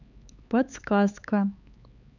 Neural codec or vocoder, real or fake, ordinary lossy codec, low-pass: codec, 16 kHz, 4 kbps, X-Codec, HuBERT features, trained on LibriSpeech; fake; none; 7.2 kHz